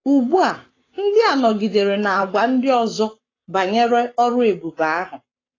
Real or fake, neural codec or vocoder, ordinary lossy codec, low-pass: fake; codec, 44.1 kHz, 7.8 kbps, Pupu-Codec; AAC, 32 kbps; 7.2 kHz